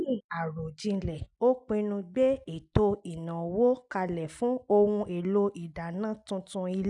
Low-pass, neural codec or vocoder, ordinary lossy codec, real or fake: 10.8 kHz; none; none; real